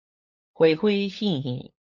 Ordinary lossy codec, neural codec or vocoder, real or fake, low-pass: MP3, 48 kbps; codec, 16 kHz, 8 kbps, FunCodec, trained on LibriTTS, 25 frames a second; fake; 7.2 kHz